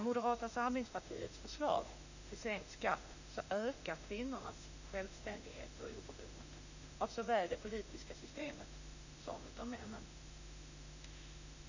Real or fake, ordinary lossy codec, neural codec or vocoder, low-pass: fake; none; autoencoder, 48 kHz, 32 numbers a frame, DAC-VAE, trained on Japanese speech; 7.2 kHz